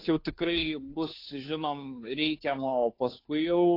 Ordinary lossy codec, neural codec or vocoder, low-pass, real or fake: AAC, 32 kbps; codec, 16 kHz, 2 kbps, FunCodec, trained on Chinese and English, 25 frames a second; 5.4 kHz; fake